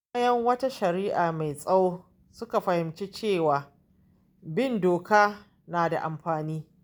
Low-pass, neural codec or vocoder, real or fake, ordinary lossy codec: none; none; real; none